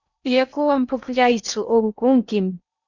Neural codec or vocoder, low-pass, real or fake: codec, 16 kHz in and 24 kHz out, 0.8 kbps, FocalCodec, streaming, 65536 codes; 7.2 kHz; fake